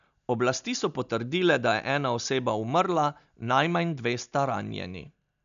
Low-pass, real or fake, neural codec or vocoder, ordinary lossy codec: 7.2 kHz; real; none; none